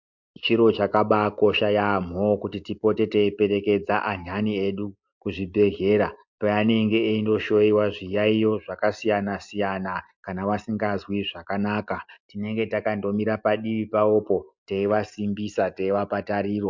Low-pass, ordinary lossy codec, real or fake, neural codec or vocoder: 7.2 kHz; MP3, 64 kbps; real; none